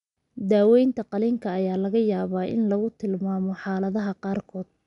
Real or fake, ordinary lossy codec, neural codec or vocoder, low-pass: real; none; none; 10.8 kHz